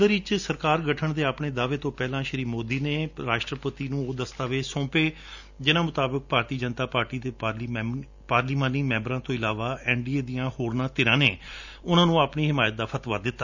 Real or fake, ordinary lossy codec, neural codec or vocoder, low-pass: real; none; none; 7.2 kHz